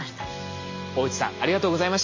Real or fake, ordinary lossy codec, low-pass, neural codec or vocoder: real; MP3, 32 kbps; 7.2 kHz; none